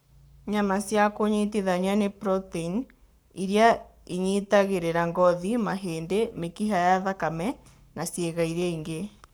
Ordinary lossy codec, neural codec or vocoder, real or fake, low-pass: none; codec, 44.1 kHz, 7.8 kbps, Pupu-Codec; fake; none